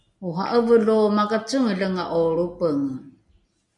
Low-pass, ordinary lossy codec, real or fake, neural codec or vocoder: 10.8 kHz; MP3, 48 kbps; real; none